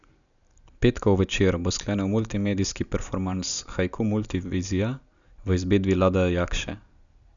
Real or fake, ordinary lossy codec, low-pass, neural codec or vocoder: real; none; 7.2 kHz; none